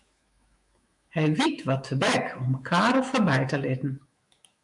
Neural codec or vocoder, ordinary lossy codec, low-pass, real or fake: autoencoder, 48 kHz, 128 numbers a frame, DAC-VAE, trained on Japanese speech; MP3, 96 kbps; 10.8 kHz; fake